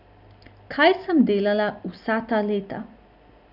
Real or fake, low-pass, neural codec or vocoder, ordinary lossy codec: real; 5.4 kHz; none; none